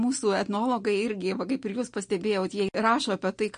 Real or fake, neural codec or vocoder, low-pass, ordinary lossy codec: real; none; 9.9 kHz; MP3, 48 kbps